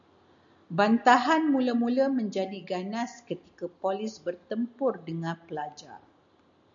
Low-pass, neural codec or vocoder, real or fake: 7.2 kHz; none; real